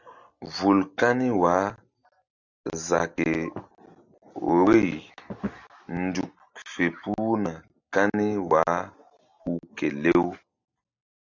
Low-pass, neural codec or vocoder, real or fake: 7.2 kHz; none; real